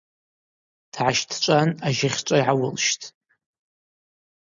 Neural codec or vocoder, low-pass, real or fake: none; 7.2 kHz; real